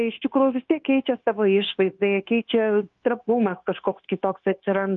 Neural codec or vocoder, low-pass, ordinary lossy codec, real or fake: codec, 16 kHz, 0.9 kbps, LongCat-Audio-Codec; 7.2 kHz; Opus, 32 kbps; fake